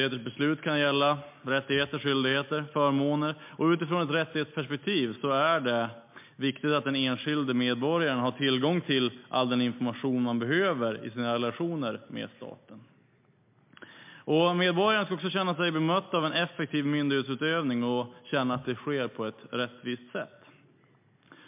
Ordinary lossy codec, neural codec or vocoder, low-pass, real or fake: MP3, 32 kbps; none; 3.6 kHz; real